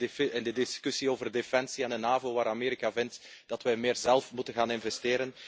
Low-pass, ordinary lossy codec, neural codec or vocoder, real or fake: none; none; none; real